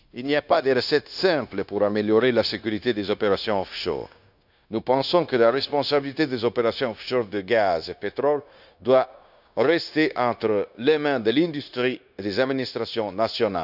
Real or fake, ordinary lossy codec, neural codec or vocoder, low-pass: fake; none; codec, 16 kHz, 0.9 kbps, LongCat-Audio-Codec; 5.4 kHz